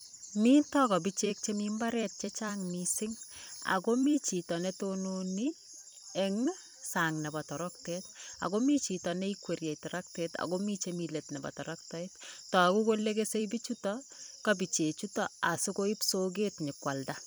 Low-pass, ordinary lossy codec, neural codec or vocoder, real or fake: none; none; vocoder, 44.1 kHz, 128 mel bands every 256 samples, BigVGAN v2; fake